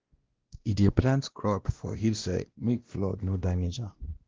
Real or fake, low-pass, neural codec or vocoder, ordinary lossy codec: fake; 7.2 kHz; codec, 16 kHz, 1 kbps, X-Codec, WavLM features, trained on Multilingual LibriSpeech; Opus, 16 kbps